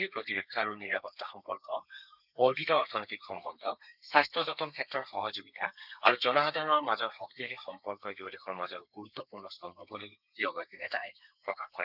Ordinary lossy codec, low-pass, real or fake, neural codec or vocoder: none; 5.4 kHz; fake; codec, 32 kHz, 1.9 kbps, SNAC